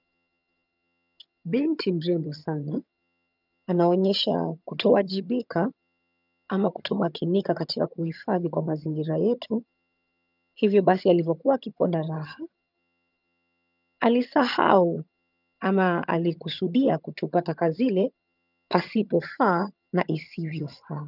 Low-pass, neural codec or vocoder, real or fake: 5.4 kHz; vocoder, 22.05 kHz, 80 mel bands, HiFi-GAN; fake